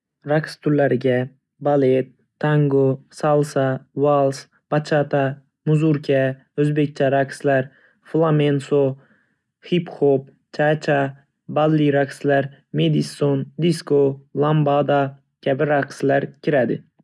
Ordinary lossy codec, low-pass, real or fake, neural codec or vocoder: none; none; real; none